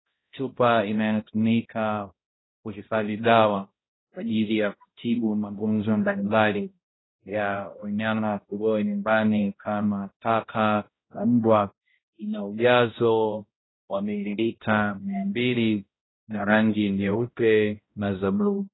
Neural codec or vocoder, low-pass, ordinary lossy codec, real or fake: codec, 16 kHz, 0.5 kbps, X-Codec, HuBERT features, trained on balanced general audio; 7.2 kHz; AAC, 16 kbps; fake